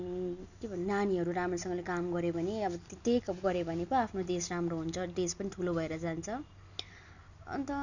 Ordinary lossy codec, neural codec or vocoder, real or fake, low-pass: none; none; real; 7.2 kHz